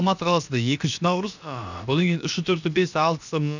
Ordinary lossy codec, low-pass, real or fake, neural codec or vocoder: none; 7.2 kHz; fake; codec, 16 kHz, about 1 kbps, DyCAST, with the encoder's durations